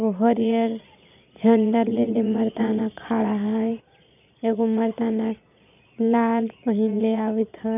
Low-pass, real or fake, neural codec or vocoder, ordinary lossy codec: 3.6 kHz; fake; vocoder, 22.05 kHz, 80 mel bands, Vocos; none